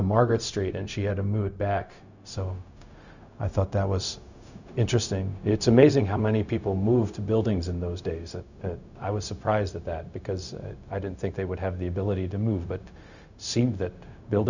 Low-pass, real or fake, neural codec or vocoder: 7.2 kHz; fake; codec, 16 kHz, 0.4 kbps, LongCat-Audio-Codec